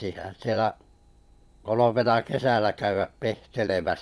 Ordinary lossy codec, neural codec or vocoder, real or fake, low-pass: none; vocoder, 22.05 kHz, 80 mel bands, Vocos; fake; none